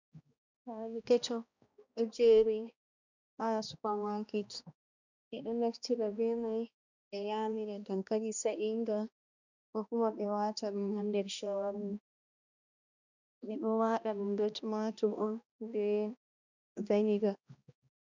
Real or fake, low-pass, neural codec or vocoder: fake; 7.2 kHz; codec, 16 kHz, 1 kbps, X-Codec, HuBERT features, trained on balanced general audio